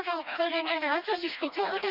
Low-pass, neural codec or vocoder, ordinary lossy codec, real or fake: 5.4 kHz; codec, 16 kHz, 1 kbps, FreqCodec, smaller model; none; fake